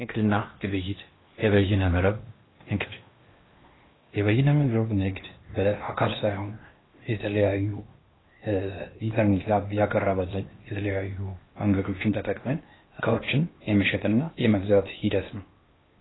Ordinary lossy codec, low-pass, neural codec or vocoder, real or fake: AAC, 16 kbps; 7.2 kHz; codec, 16 kHz in and 24 kHz out, 0.8 kbps, FocalCodec, streaming, 65536 codes; fake